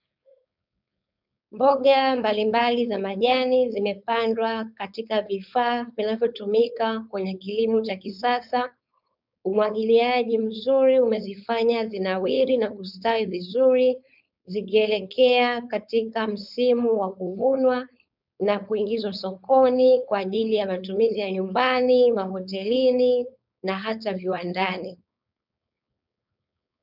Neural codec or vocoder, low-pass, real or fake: codec, 16 kHz, 4.8 kbps, FACodec; 5.4 kHz; fake